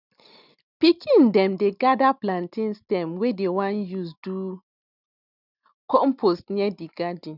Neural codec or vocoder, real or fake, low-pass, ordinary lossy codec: none; real; 5.4 kHz; none